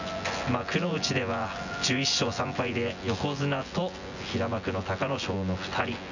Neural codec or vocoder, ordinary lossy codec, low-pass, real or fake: vocoder, 24 kHz, 100 mel bands, Vocos; none; 7.2 kHz; fake